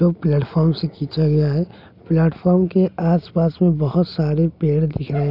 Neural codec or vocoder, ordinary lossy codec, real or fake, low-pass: none; none; real; 5.4 kHz